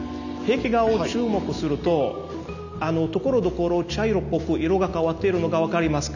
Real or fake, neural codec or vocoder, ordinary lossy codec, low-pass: real; none; none; 7.2 kHz